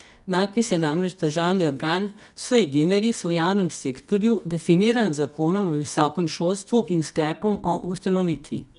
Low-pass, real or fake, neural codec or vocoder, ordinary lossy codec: 10.8 kHz; fake; codec, 24 kHz, 0.9 kbps, WavTokenizer, medium music audio release; none